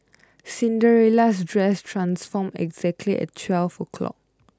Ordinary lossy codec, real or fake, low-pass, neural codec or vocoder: none; real; none; none